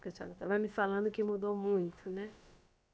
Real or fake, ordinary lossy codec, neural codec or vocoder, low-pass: fake; none; codec, 16 kHz, about 1 kbps, DyCAST, with the encoder's durations; none